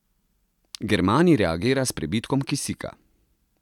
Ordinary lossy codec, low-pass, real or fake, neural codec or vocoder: none; 19.8 kHz; real; none